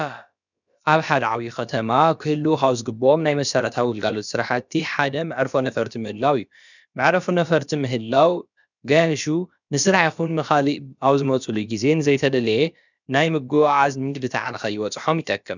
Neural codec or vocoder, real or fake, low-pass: codec, 16 kHz, about 1 kbps, DyCAST, with the encoder's durations; fake; 7.2 kHz